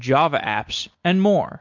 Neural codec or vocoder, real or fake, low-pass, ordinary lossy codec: none; real; 7.2 kHz; MP3, 48 kbps